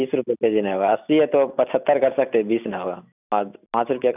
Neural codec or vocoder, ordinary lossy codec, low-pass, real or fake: none; none; 3.6 kHz; real